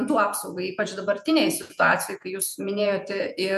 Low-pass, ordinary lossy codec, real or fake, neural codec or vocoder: 14.4 kHz; MP3, 96 kbps; fake; vocoder, 48 kHz, 128 mel bands, Vocos